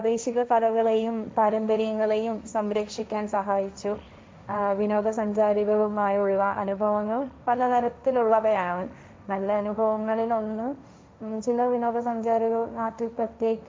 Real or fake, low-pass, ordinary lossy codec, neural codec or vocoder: fake; none; none; codec, 16 kHz, 1.1 kbps, Voila-Tokenizer